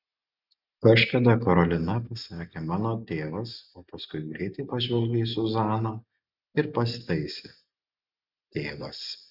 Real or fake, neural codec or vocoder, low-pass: real; none; 5.4 kHz